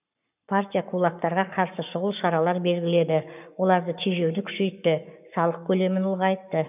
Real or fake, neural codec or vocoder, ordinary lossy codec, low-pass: fake; codec, 44.1 kHz, 7.8 kbps, Pupu-Codec; none; 3.6 kHz